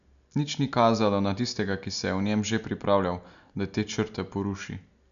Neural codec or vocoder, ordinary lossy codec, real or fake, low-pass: none; none; real; 7.2 kHz